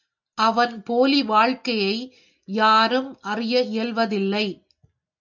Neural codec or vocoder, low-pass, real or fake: none; 7.2 kHz; real